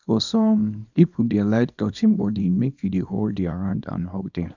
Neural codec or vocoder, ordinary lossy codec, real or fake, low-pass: codec, 24 kHz, 0.9 kbps, WavTokenizer, small release; none; fake; 7.2 kHz